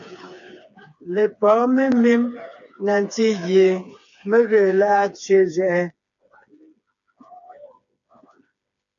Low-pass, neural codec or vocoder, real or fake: 7.2 kHz; codec, 16 kHz, 4 kbps, FreqCodec, smaller model; fake